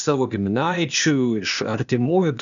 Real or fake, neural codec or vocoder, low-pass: fake; codec, 16 kHz, 0.8 kbps, ZipCodec; 7.2 kHz